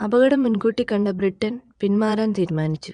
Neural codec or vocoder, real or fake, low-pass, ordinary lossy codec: vocoder, 22.05 kHz, 80 mel bands, Vocos; fake; 9.9 kHz; none